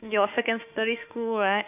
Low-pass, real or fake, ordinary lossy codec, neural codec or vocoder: 3.6 kHz; fake; none; autoencoder, 48 kHz, 32 numbers a frame, DAC-VAE, trained on Japanese speech